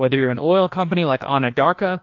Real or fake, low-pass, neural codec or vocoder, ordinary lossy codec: fake; 7.2 kHz; codec, 16 kHz, 1 kbps, FreqCodec, larger model; AAC, 48 kbps